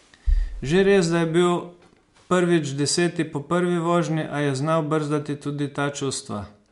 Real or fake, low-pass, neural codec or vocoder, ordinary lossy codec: real; 10.8 kHz; none; MP3, 64 kbps